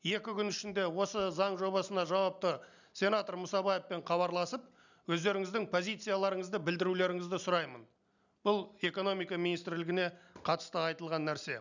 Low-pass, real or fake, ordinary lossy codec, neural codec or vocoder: 7.2 kHz; real; none; none